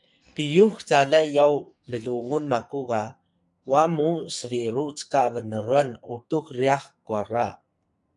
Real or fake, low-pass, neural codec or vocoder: fake; 10.8 kHz; codec, 44.1 kHz, 2.6 kbps, SNAC